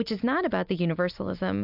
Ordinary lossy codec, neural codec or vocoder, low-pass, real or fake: AAC, 48 kbps; none; 5.4 kHz; real